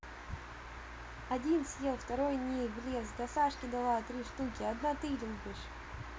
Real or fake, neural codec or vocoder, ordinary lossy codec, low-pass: real; none; none; none